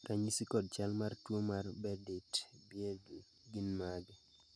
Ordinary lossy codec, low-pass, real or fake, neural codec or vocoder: none; none; real; none